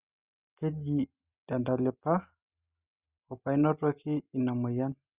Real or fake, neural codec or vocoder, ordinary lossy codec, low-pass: real; none; Opus, 64 kbps; 3.6 kHz